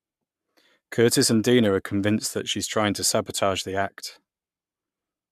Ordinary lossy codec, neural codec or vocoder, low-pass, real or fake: MP3, 96 kbps; codec, 44.1 kHz, 7.8 kbps, Pupu-Codec; 14.4 kHz; fake